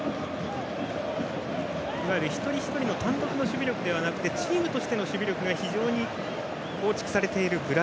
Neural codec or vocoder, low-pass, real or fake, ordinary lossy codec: none; none; real; none